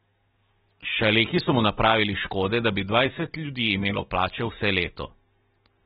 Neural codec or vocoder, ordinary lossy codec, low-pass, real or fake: none; AAC, 16 kbps; 10.8 kHz; real